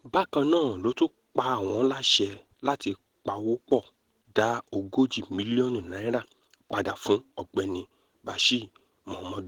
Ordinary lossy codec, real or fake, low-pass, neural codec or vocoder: Opus, 16 kbps; real; 19.8 kHz; none